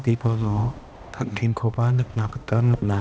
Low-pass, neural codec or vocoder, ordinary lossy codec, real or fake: none; codec, 16 kHz, 1 kbps, X-Codec, HuBERT features, trained on balanced general audio; none; fake